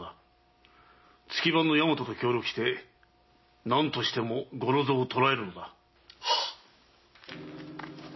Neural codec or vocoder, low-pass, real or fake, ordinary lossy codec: none; 7.2 kHz; real; MP3, 24 kbps